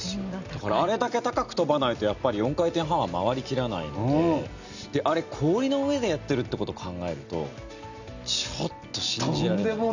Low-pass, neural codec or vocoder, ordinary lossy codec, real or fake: 7.2 kHz; none; none; real